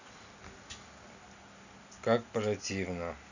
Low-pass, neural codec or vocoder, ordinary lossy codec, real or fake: 7.2 kHz; none; none; real